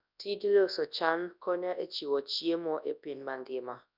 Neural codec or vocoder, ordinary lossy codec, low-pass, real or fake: codec, 24 kHz, 0.9 kbps, WavTokenizer, large speech release; none; 5.4 kHz; fake